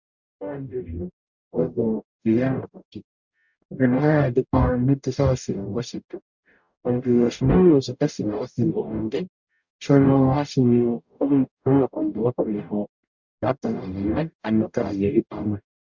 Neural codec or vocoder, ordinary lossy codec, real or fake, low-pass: codec, 44.1 kHz, 0.9 kbps, DAC; Opus, 64 kbps; fake; 7.2 kHz